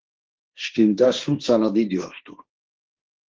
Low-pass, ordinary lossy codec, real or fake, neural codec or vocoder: 7.2 kHz; Opus, 16 kbps; fake; codec, 16 kHz, 1.1 kbps, Voila-Tokenizer